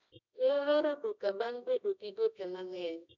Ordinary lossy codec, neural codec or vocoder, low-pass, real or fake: none; codec, 24 kHz, 0.9 kbps, WavTokenizer, medium music audio release; 7.2 kHz; fake